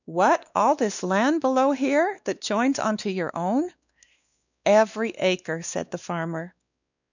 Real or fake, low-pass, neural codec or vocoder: fake; 7.2 kHz; codec, 16 kHz, 2 kbps, X-Codec, WavLM features, trained on Multilingual LibriSpeech